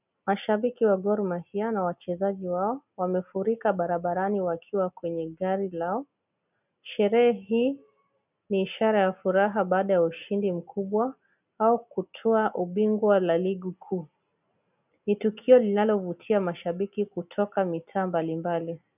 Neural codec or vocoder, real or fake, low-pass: none; real; 3.6 kHz